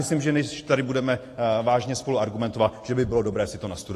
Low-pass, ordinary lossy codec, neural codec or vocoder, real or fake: 14.4 kHz; AAC, 48 kbps; none; real